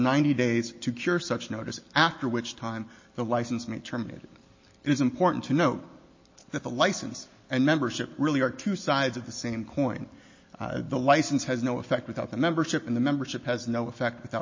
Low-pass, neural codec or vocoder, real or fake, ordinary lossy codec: 7.2 kHz; none; real; MP3, 32 kbps